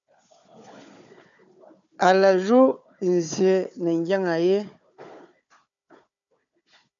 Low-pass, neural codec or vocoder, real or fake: 7.2 kHz; codec, 16 kHz, 4 kbps, FunCodec, trained on Chinese and English, 50 frames a second; fake